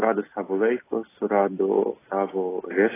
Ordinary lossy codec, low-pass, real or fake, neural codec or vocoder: AAC, 16 kbps; 3.6 kHz; real; none